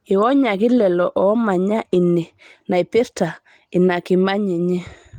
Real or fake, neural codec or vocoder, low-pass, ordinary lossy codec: real; none; 19.8 kHz; Opus, 24 kbps